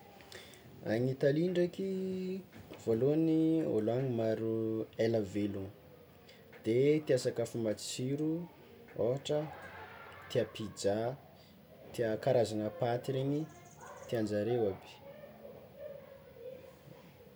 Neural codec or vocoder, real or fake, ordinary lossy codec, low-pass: none; real; none; none